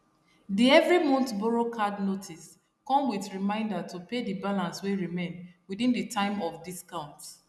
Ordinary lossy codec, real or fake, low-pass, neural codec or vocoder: none; real; none; none